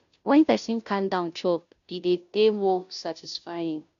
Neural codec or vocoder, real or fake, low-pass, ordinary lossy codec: codec, 16 kHz, 0.5 kbps, FunCodec, trained on Chinese and English, 25 frames a second; fake; 7.2 kHz; none